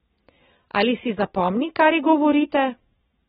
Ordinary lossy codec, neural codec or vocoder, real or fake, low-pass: AAC, 16 kbps; none; real; 7.2 kHz